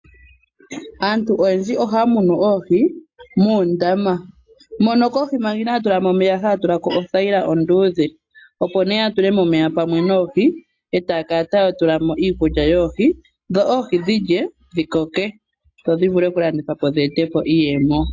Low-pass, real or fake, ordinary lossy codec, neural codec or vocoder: 7.2 kHz; real; AAC, 48 kbps; none